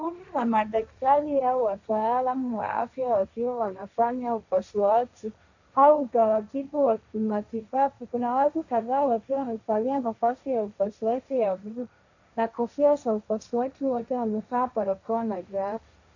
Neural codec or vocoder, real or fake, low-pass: codec, 16 kHz, 1.1 kbps, Voila-Tokenizer; fake; 7.2 kHz